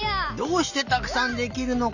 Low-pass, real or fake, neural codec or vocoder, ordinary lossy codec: 7.2 kHz; real; none; none